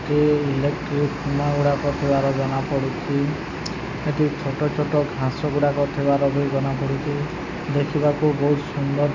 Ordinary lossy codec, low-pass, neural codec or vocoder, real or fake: none; 7.2 kHz; none; real